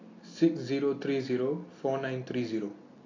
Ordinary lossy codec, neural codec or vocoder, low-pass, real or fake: AAC, 32 kbps; none; 7.2 kHz; real